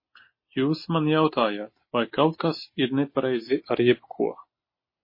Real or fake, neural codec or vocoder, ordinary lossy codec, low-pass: real; none; MP3, 24 kbps; 5.4 kHz